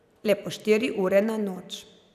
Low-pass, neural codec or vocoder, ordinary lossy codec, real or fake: 14.4 kHz; vocoder, 44.1 kHz, 128 mel bands every 256 samples, BigVGAN v2; none; fake